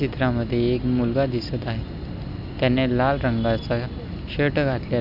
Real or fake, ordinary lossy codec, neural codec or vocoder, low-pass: real; none; none; 5.4 kHz